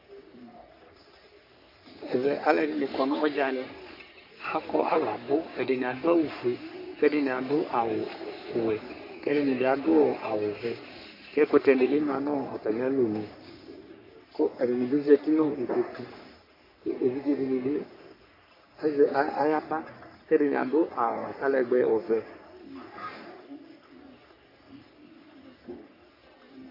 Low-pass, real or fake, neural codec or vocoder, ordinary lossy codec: 5.4 kHz; fake; codec, 44.1 kHz, 3.4 kbps, Pupu-Codec; MP3, 32 kbps